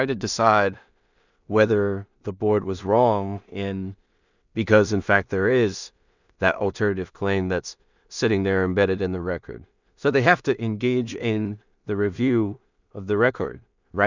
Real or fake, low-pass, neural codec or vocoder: fake; 7.2 kHz; codec, 16 kHz in and 24 kHz out, 0.4 kbps, LongCat-Audio-Codec, two codebook decoder